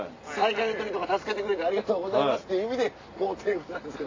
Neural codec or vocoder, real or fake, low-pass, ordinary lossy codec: none; real; 7.2 kHz; AAC, 32 kbps